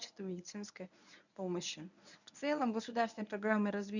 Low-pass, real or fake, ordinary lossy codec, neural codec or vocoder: 7.2 kHz; fake; none; codec, 24 kHz, 0.9 kbps, WavTokenizer, medium speech release version 1